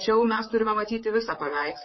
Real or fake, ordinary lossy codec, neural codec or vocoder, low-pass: fake; MP3, 24 kbps; vocoder, 44.1 kHz, 128 mel bands, Pupu-Vocoder; 7.2 kHz